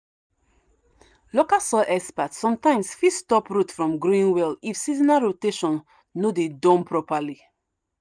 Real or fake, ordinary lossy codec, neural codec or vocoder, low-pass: real; none; none; 9.9 kHz